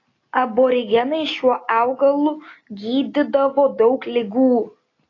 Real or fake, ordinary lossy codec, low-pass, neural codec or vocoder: real; AAC, 32 kbps; 7.2 kHz; none